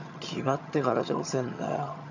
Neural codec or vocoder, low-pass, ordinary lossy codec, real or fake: vocoder, 22.05 kHz, 80 mel bands, HiFi-GAN; 7.2 kHz; none; fake